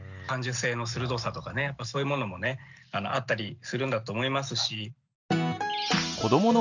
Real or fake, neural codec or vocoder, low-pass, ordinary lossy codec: real; none; 7.2 kHz; none